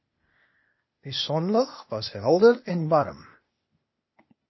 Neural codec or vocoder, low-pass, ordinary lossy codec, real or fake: codec, 16 kHz, 0.8 kbps, ZipCodec; 7.2 kHz; MP3, 24 kbps; fake